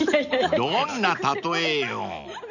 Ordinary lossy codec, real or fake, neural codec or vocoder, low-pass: none; real; none; 7.2 kHz